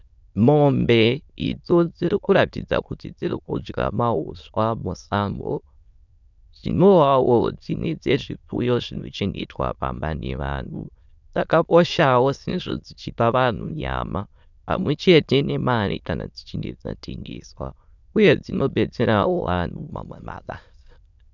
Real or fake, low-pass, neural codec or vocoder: fake; 7.2 kHz; autoencoder, 22.05 kHz, a latent of 192 numbers a frame, VITS, trained on many speakers